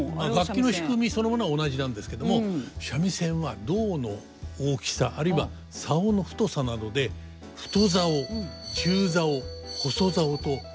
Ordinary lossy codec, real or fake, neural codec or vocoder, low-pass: none; real; none; none